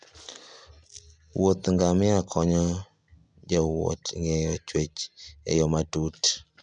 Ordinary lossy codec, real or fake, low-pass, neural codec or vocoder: none; real; 9.9 kHz; none